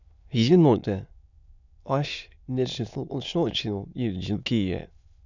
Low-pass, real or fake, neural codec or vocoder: 7.2 kHz; fake; autoencoder, 22.05 kHz, a latent of 192 numbers a frame, VITS, trained on many speakers